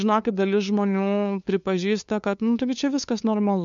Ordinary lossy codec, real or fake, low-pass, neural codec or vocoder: MP3, 96 kbps; fake; 7.2 kHz; codec, 16 kHz, 2 kbps, FunCodec, trained on LibriTTS, 25 frames a second